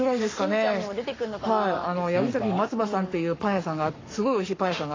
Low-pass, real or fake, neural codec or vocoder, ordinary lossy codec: 7.2 kHz; fake; codec, 44.1 kHz, 7.8 kbps, Pupu-Codec; AAC, 32 kbps